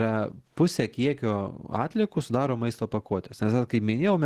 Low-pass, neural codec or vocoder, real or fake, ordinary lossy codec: 14.4 kHz; none; real; Opus, 16 kbps